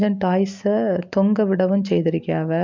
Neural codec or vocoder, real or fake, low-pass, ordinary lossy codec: none; real; 7.2 kHz; MP3, 64 kbps